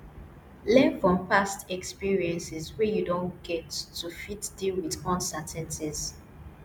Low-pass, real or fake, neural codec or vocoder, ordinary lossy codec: none; real; none; none